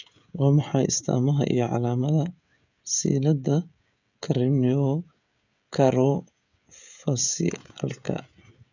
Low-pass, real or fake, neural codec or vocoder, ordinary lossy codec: 7.2 kHz; fake; codec, 16 kHz, 16 kbps, FreqCodec, smaller model; none